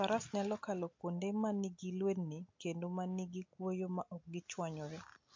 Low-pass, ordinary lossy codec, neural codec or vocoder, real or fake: 7.2 kHz; MP3, 48 kbps; none; real